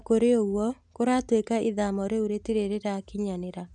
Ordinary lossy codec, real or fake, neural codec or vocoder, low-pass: none; real; none; 10.8 kHz